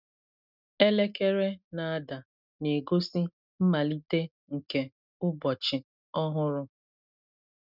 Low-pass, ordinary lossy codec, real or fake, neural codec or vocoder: 5.4 kHz; none; real; none